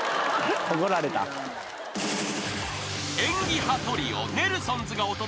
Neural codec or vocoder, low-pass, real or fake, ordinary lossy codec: none; none; real; none